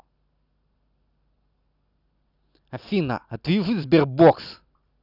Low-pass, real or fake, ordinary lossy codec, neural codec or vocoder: 5.4 kHz; real; none; none